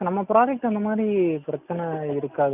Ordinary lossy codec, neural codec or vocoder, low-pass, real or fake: none; none; 3.6 kHz; real